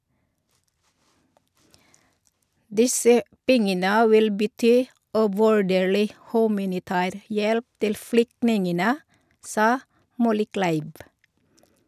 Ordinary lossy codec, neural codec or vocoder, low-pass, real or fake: none; none; 14.4 kHz; real